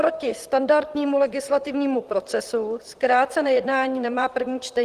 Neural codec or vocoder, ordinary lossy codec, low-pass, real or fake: vocoder, 44.1 kHz, 128 mel bands, Pupu-Vocoder; Opus, 24 kbps; 14.4 kHz; fake